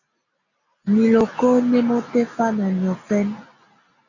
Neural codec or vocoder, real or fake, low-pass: none; real; 7.2 kHz